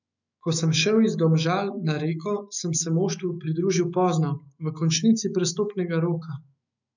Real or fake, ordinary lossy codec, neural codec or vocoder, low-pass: fake; none; autoencoder, 48 kHz, 128 numbers a frame, DAC-VAE, trained on Japanese speech; 7.2 kHz